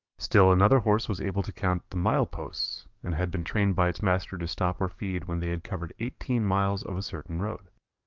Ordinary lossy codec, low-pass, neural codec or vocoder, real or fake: Opus, 24 kbps; 7.2 kHz; codec, 44.1 kHz, 7.8 kbps, DAC; fake